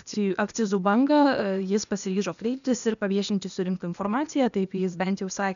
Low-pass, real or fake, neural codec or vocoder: 7.2 kHz; fake; codec, 16 kHz, 0.8 kbps, ZipCodec